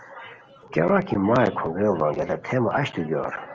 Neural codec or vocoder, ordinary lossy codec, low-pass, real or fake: none; Opus, 24 kbps; 7.2 kHz; real